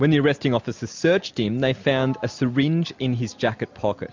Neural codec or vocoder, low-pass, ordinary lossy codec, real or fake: none; 7.2 kHz; MP3, 64 kbps; real